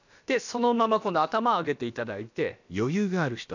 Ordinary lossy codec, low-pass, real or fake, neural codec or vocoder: none; 7.2 kHz; fake; codec, 16 kHz, about 1 kbps, DyCAST, with the encoder's durations